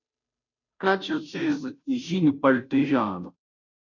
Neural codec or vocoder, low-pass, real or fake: codec, 16 kHz, 0.5 kbps, FunCodec, trained on Chinese and English, 25 frames a second; 7.2 kHz; fake